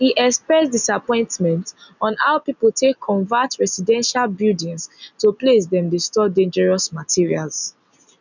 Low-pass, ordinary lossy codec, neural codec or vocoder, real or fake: 7.2 kHz; none; none; real